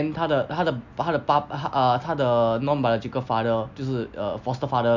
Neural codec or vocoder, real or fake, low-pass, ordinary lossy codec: none; real; 7.2 kHz; none